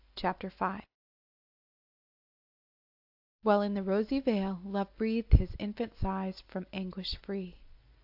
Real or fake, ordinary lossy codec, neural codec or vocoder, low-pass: real; AAC, 48 kbps; none; 5.4 kHz